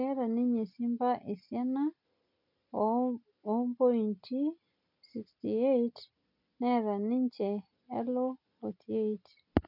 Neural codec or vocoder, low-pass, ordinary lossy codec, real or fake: none; 5.4 kHz; none; real